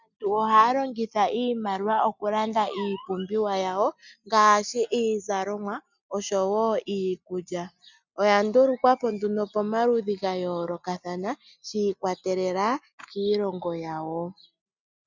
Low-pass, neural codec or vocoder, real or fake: 7.2 kHz; none; real